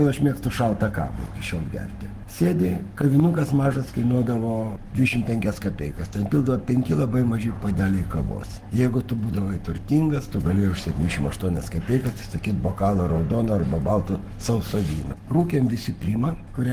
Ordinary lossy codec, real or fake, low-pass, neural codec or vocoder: Opus, 24 kbps; fake; 14.4 kHz; codec, 44.1 kHz, 7.8 kbps, Pupu-Codec